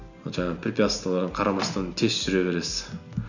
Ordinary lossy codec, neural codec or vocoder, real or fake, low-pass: none; none; real; 7.2 kHz